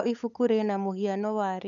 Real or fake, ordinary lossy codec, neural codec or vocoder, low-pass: fake; none; codec, 16 kHz, 8 kbps, FunCodec, trained on LibriTTS, 25 frames a second; 7.2 kHz